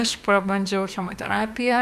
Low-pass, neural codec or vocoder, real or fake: 14.4 kHz; autoencoder, 48 kHz, 32 numbers a frame, DAC-VAE, trained on Japanese speech; fake